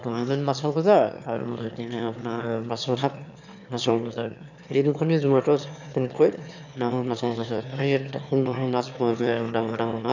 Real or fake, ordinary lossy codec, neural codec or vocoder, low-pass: fake; none; autoencoder, 22.05 kHz, a latent of 192 numbers a frame, VITS, trained on one speaker; 7.2 kHz